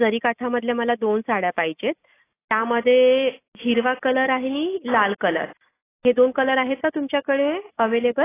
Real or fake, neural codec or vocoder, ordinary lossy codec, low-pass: real; none; AAC, 16 kbps; 3.6 kHz